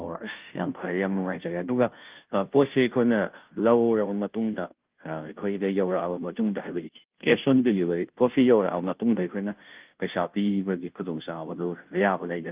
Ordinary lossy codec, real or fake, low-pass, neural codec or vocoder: Opus, 32 kbps; fake; 3.6 kHz; codec, 16 kHz, 0.5 kbps, FunCodec, trained on Chinese and English, 25 frames a second